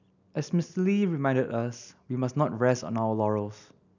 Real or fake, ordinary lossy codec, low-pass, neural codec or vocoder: real; none; 7.2 kHz; none